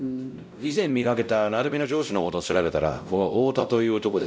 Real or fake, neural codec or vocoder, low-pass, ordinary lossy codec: fake; codec, 16 kHz, 0.5 kbps, X-Codec, WavLM features, trained on Multilingual LibriSpeech; none; none